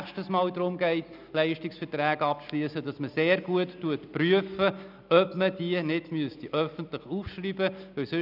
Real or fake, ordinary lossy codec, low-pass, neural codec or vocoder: real; none; 5.4 kHz; none